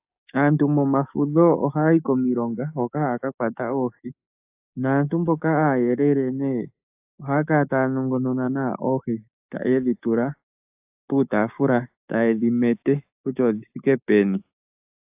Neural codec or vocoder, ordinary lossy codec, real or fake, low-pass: codec, 16 kHz, 6 kbps, DAC; AAC, 32 kbps; fake; 3.6 kHz